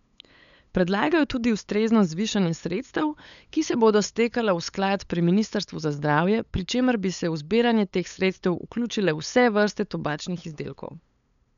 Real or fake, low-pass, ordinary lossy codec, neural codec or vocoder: fake; 7.2 kHz; none; codec, 16 kHz, 8 kbps, FunCodec, trained on LibriTTS, 25 frames a second